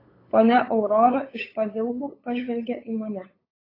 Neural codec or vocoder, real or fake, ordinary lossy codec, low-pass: codec, 16 kHz, 8 kbps, FunCodec, trained on LibriTTS, 25 frames a second; fake; AAC, 24 kbps; 5.4 kHz